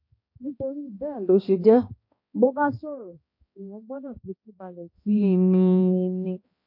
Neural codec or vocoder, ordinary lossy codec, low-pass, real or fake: codec, 16 kHz, 1 kbps, X-Codec, HuBERT features, trained on balanced general audio; MP3, 32 kbps; 5.4 kHz; fake